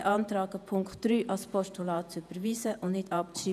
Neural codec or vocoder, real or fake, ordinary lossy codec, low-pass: vocoder, 48 kHz, 128 mel bands, Vocos; fake; none; 14.4 kHz